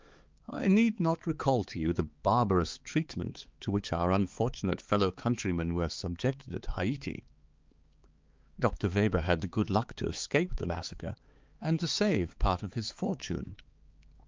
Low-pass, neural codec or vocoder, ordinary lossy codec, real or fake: 7.2 kHz; codec, 16 kHz, 4 kbps, X-Codec, HuBERT features, trained on balanced general audio; Opus, 32 kbps; fake